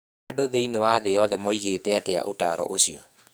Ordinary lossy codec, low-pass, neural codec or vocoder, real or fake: none; none; codec, 44.1 kHz, 2.6 kbps, SNAC; fake